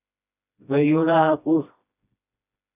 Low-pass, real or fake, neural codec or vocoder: 3.6 kHz; fake; codec, 16 kHz, 1 kbps, FreqCodec, smaller model